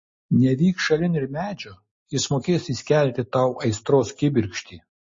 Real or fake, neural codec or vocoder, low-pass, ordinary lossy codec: real; none; 7.2 kHz; MP3, 32 kbps